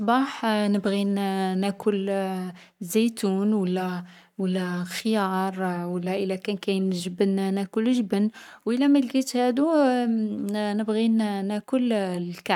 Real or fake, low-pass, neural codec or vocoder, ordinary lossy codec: fake; 19.8 kHz; codec, 44.1 kHz, 7.8 kbps, Pupu-Codec; none